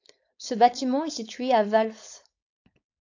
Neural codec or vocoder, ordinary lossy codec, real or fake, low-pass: codec, 16 kHz, 4.8 kbps, FACodec; AAC, 48 kbps; fake; 7.2 kHz